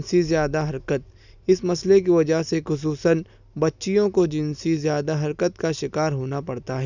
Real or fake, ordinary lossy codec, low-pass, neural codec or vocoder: real; none; 7.2 kHz; none